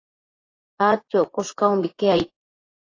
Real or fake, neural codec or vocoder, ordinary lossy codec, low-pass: fake; vocoder, 24 kHz, 100 mel bands, Vocos; AAC, 32 kbps; 7.2 kHz